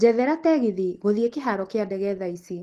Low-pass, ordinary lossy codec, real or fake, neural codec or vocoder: 14.4 kHz; Opus, 16 kbps; real; none